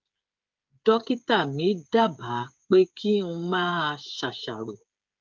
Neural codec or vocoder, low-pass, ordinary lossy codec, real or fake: codec, 16 kHz, 8 kbps, FreqCodec, smaller model; 7.2 kHz; Opus, 24 kbps; fake